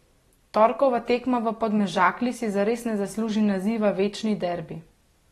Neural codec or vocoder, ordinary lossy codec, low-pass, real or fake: none; AAC, 32 kbps; 19.8 kHz; real